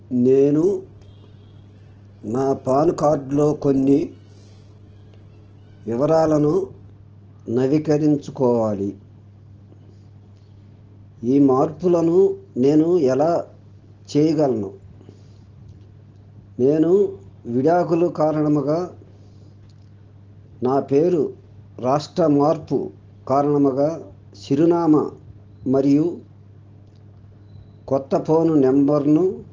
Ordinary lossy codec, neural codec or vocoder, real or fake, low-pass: Opus, 16 kbps; none; real; 7.2 kHz